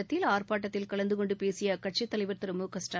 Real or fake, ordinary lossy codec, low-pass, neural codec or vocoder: real; none; none; none